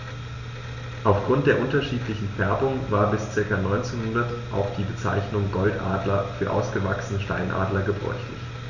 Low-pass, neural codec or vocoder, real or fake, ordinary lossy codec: 7.2 kHz; none; real; none